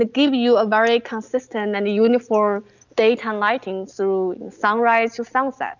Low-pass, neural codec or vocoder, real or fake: 7.2 kHz; none; real